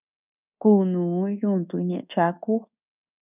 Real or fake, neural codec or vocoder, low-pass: fake; codec, 24 kHz, 1.2 kbps, DualCodec; 3.6 kHz